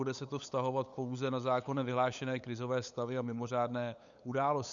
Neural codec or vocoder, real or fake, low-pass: codec, 16 kHz, 8 kbps, FunCodec, trained on LibriTTS, 25 frames a second; fake; 7.2 kHz